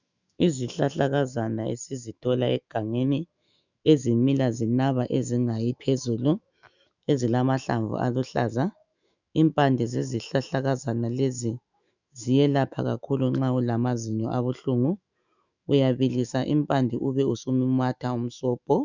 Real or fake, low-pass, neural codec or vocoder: fake; 7.2 kHz; autoencoder, 48 kHz, 128 numbers a frame, DAC-VAE, trained on Japanese speech